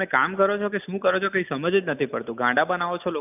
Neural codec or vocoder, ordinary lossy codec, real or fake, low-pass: none; none; real; 3.6 kHz